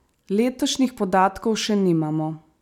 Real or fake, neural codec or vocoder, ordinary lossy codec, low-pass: real; none; none; 19.8 kHz